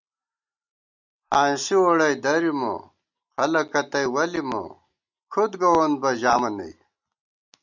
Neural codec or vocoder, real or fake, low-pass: none; real; 7.2 kHz